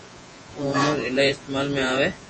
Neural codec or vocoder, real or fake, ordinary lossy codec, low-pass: vocoder, 48 kHz, 128 mel bands, Vocos; fake; MP3, 32 kbps; 10.8 kHz